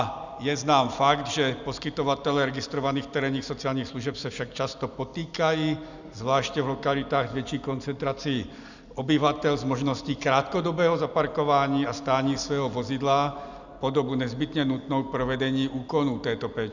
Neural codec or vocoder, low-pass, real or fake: none; 7.2 kHz; real